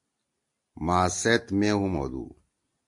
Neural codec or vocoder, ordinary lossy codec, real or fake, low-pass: none; AAC, 64 kbps; real; 10.8 kHz